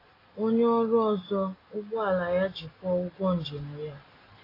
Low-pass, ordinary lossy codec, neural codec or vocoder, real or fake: 5.4 kHz; AAC, 24 kbps; none; real